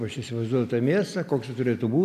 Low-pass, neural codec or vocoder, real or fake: 14.4 kHz; none; real